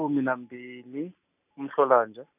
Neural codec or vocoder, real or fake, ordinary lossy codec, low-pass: none; real; none; 3.6 kHz